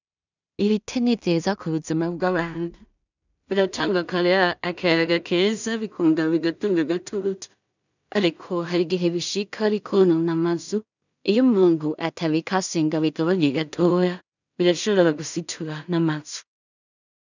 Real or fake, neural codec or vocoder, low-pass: fake; codec, 16 kHz in and 24 kHz out, 0.4 kbps, LongCat-Audio-Codec, two codebook decoder; 7.2 kHz